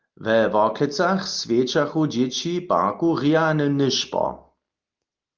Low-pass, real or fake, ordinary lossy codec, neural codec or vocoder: 7.2 kHz; real; Opus, 32 kbps; none